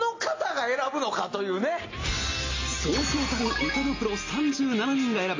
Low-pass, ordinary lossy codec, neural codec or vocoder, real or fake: 7.2 kHz; AAC, 32 kbps; none; real